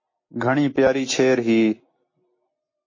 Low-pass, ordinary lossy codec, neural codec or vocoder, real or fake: 7.2 kHz; MP3, 32 kbps; none; real